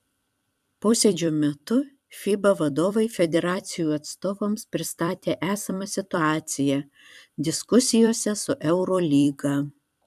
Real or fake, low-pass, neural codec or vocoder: fake; 14.4 kHz; vocoder, 44.1 kHz, 128 mel bands every 256 samples, BigVGAN v2